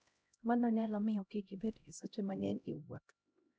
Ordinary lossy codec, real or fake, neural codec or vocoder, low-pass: none; fake; codec, 16 kHz, 0.5 kbps, X-Codec, HuBERT features, trained on LibriSpeech; none